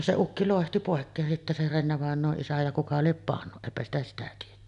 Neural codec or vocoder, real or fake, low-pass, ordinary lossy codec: none; real; 10.8 kHz; none